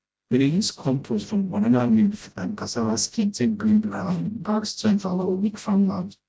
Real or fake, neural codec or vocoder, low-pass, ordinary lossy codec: fake; codec, 16 kHz, 0.5 kbps, FreqCodec, smaller model; none; none